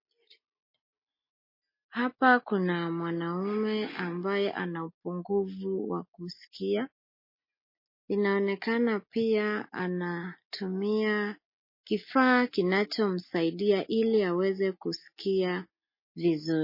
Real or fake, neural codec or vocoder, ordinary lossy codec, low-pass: real; none; MP3, 24 kbps; 5.4 kHz